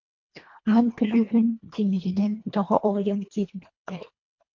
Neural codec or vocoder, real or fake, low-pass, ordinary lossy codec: codec, 24 kHz, 1.5 kbps, HILCodec; fake; 7.2 kHz; MP3, 48 kbps